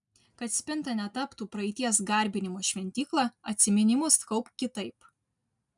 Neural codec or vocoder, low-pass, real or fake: none; 10.8 kHz; real